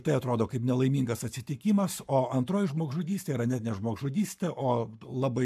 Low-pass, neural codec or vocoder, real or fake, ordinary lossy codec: 14.4 kHz; codec, 44.1 kHz, 7.8 kbps, Pupu-Codec; fake; AAC, 96 kbps